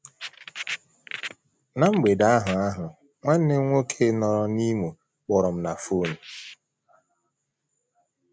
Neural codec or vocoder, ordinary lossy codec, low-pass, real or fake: none; none; none; real